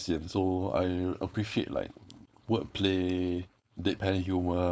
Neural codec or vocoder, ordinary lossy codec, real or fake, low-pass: codec, 16 kHz, 4.8 kbps, FACodec; none; fake; none